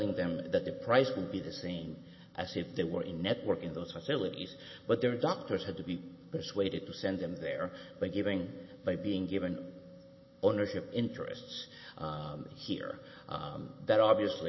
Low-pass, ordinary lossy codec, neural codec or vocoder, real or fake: 7.2 kHz; MP3, 24 kbps; none; real